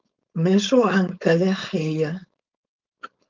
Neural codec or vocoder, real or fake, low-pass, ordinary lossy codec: codec, 16 kHz, 4.8 kbps, FACodec; fake; 7.2 kHz; Opus, 32 kbps